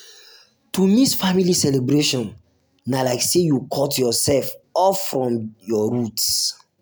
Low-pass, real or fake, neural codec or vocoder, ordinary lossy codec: none; real; none; none